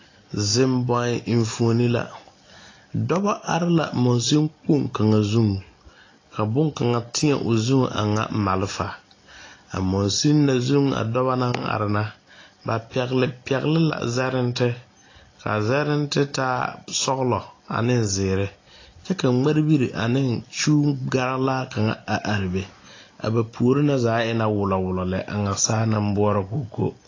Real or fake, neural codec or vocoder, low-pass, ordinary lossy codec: real; none; 7.2 kHz; AAC, 32 kbps